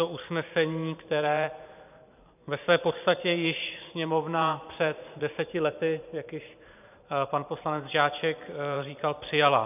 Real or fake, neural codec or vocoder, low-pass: fake; vocoder, 44.1 kHz, 128 mel bands every 512 samples, BigVGAN v2; 3.6 kHz